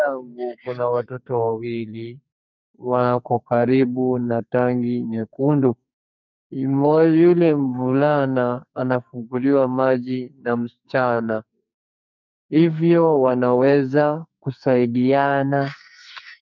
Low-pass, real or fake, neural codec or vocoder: 7.2 kHz; fake; codec, 44.1 kHz, 2.6 kbps, SNAC